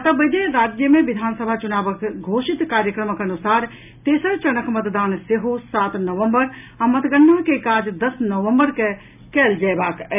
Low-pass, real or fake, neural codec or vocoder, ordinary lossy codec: 3.6 kHz; real; none; none